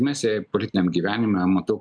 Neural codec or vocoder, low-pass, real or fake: none; 9.9 kHz; real